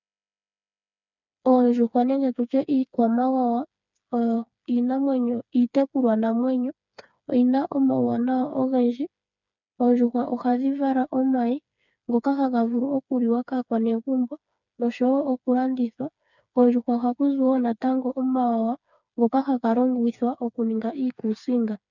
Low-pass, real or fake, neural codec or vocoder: 7.2 kHz; fake; codec, 16 kHz, 4 kbps, FreqCodec, smaller model